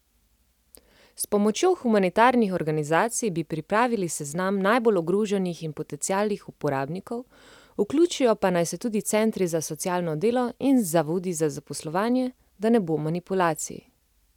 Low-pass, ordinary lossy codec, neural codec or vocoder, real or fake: 19.8 kHz; none; none; real